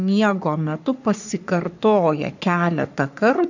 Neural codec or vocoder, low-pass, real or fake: codec, 44.1 kHz, 3.4 kbps, Pupu-Codec; 7.2 kHz; fake